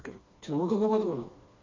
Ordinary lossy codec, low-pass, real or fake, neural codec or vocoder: MP3, 48 kbps; 7.2 kHz; fake; codec, 16 kHz, 2 kbps, FreqCodec, smaller model